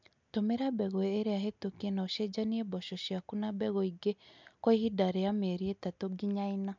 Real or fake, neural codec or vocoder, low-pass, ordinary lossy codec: real; none; 7.2 kHz; MP3, 64 kbps